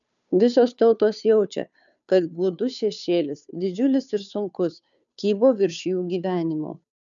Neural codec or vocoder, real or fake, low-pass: codec, 16 kHz, 2 kbps, FunCodec, trained on Chinese and English, 25 frames a second; fake; 7.2 kHz